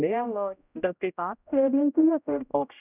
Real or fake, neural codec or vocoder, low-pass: fake; codec, 16 kHz, 0.5 kbps, X-Codec, HuBERT features, trained on general audio; 3.6 kHz